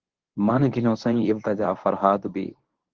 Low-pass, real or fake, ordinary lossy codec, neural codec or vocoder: 7.2 kHz; fake; Opus, 16 kbps; codec, 24 kHz, 0.9 kbps, WavTokenizer, medium speech release version 1